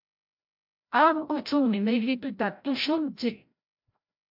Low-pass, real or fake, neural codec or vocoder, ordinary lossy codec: 5.4 kHz; fake; codec, 16 kHz, 0.5 kbps, FreqCodec, larger model; MP3, 48 kbps